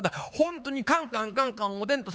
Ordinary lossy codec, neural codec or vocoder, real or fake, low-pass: none; codec, 16 kHz, 4 kbps, X-Codec, HuBERT features, trained on LibriSpeech; fake; none